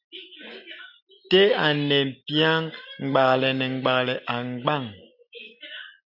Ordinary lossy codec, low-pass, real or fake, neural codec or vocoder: AAC, 48 kbps; 5.4 kHz; real; none